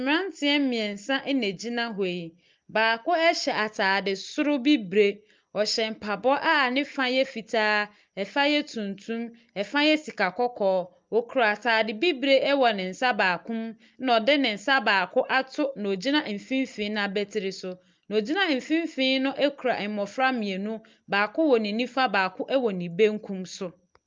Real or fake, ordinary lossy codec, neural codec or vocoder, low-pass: real; Opus, 24 kbps; none; 7.2 kHz